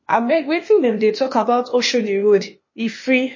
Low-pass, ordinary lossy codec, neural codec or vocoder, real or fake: 7.2 kHz; MP3, 32 kbps; codec, 16 kHz, 0.8 kbps, ZipCodec; fake